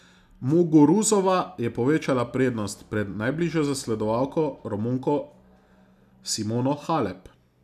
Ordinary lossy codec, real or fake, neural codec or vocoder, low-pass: none; real; none; 14.4 kHz